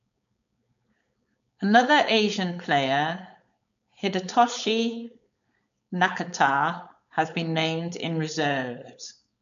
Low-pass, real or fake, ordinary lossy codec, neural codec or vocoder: 7.2 kHz; fake; none; codec, 16 kHz, 4.8 kbps, FACodec